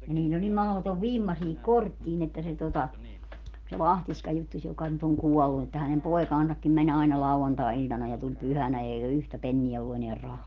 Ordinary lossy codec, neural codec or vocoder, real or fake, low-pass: Opus, 16 kbps; none; real; 7.2 kHz